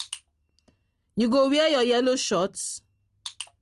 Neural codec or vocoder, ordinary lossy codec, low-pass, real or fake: none; Opus, 24 kbps; 10.8 kHz; real